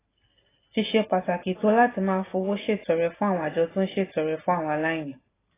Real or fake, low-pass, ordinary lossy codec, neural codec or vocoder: real; 3.6 kHz; AAC, 16 kbps; none